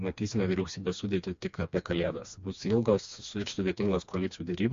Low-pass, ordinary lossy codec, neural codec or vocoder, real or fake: 7.2 kHz; MP3, 48 kbps; codec, 16 kHz, 2 kbps, FreqCodec, smaller model; fake